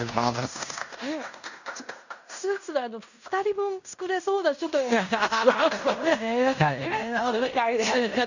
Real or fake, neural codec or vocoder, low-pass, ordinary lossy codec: fake; codec, 16 kHz in and 24 kHz out, 0.9 kbps, LongCat-Audio-Codec, fine tuned four codebook decoder; 7.2 kHz; none